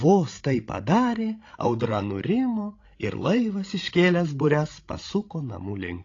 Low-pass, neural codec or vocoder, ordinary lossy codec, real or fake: 7.2 kHz; codec, 16 kHz, 16 kbps, FreqCodec, larger model; AAC, 32 kbps; fake